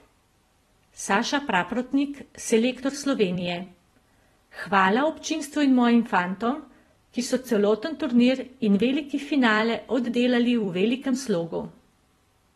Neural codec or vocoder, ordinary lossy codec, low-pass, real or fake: vocoder, 44.1 kHz, 128 mel bands every 256 samples, BigVGAN v2; AAC, 32 kbps; 19.8 kHz; fake